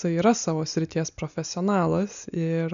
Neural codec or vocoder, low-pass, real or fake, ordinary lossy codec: none; 7.2 kHz; real; MP3, 96 kbps